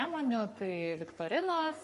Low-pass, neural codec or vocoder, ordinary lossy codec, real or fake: 14.4 kHz; codec, 44.1 kHz, 3.4 kbps, Pupu-Codec; MP3, 48 kbps; fake